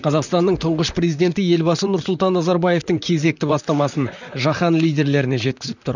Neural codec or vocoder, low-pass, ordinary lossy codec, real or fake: vocoder, 44.1 kHz, 128 mel bands, Pupu-Vocoder; 7.2 kHz; none; fake